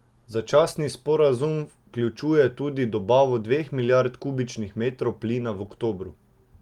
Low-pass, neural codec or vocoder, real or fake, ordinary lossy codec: 19.8 kHz; none; real; Opus, 24 kbps